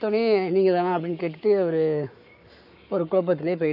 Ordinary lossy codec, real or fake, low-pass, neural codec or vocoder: none; fake; 5.4 kHz; codec, 44.1 kHz, 7.8 kbps, Pupu-Codec